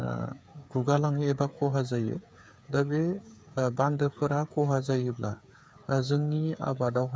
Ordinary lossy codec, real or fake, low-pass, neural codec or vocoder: none; fake; none; codec, 16 kHz, 8 kbps, FreqCodec, smaller model